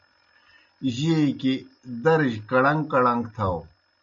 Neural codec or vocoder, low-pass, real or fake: none; 7.2 kHz; real